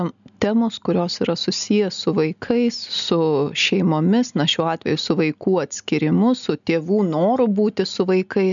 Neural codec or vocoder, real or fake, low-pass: none; real; 7.2 kHz